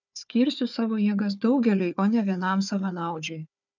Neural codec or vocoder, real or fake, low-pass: codec, 16 kHz, 4 kbps, FunCodec, trained on Chinese and English, 50 frames a second; fake; 7.2 kHz